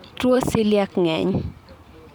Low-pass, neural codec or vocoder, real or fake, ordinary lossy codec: none; vocoder, 44.1 kHz, 128 mel bands every 512 samples, BigVGAN v2; fake; none